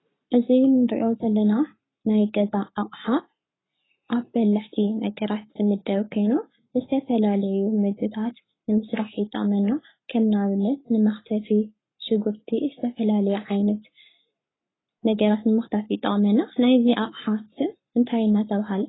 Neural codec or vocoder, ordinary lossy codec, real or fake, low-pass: codec, 44.1 kHz, 7.8 kbps, Pupu-Codec; AAC, 16 kbps; fake; 7.2 kHz